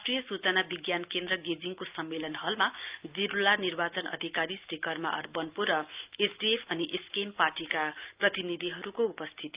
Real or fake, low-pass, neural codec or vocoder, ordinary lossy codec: real; 3.6 kHz; none; Opus, 24 kbps